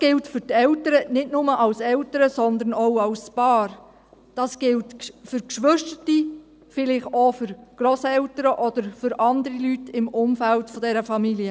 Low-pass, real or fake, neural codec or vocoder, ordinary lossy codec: none; real; none; none